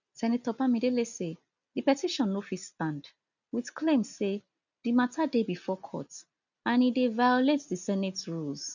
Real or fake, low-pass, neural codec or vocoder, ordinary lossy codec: real; 7.2 kHz; none; none